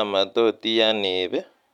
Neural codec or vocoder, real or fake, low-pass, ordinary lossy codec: none; real; 19.8 kHz; none